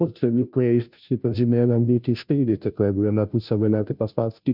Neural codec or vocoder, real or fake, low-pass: codec, 16 kHz, 0.5 kbps, FunCodec, trained on Chinese and English, 25 frames a second; fake; 5.4 kHz